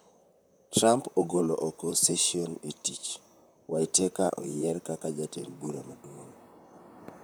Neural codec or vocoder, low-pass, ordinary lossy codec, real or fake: vocoder, 44.1 kHz, 128 mel bands, Pupu-Vocoder; none; none; fake